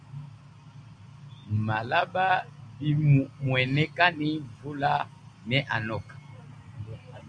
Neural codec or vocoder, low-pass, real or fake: none; 9.9 kHz; real